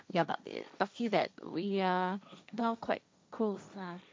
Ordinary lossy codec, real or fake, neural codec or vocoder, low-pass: none; fake; codec, 16 kHz, 1.1 kbps, Voila-Tokenizer; none